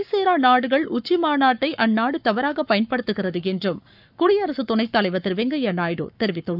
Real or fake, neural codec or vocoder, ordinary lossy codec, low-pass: fake; codec, 44.1 kHz, 7.8 kbps, Pupu-Codec; none; 5.4 kHz